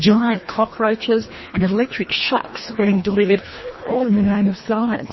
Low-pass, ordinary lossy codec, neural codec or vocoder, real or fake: 7.2 kHz; MP3, 24 kbps; codec, 24 kHz, 1.5 kbps, HILCodec; fake